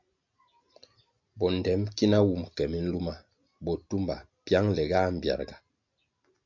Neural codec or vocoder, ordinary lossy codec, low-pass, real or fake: none; MP3, 64 kbps; 7.2 kHz; real